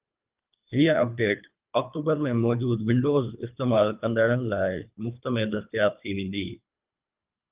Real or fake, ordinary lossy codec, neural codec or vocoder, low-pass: fake; Opus, 24 kbps; codec, 24 kHz, 3 kbps, HILCodec; 3.6 kHz